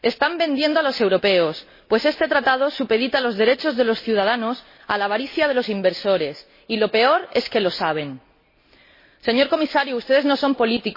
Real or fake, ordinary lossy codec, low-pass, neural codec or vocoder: real; MP3, 24 kbps; 5.4 kHz; none